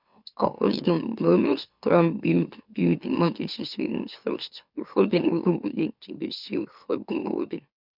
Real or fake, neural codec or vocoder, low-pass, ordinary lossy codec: fake; autoencoder, 44.1 kHz, a latent of 192 numbers a frame, MeloTTS; 5.4 kHz; none